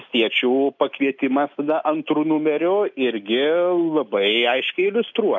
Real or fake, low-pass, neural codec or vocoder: real; 7.2 kHz; none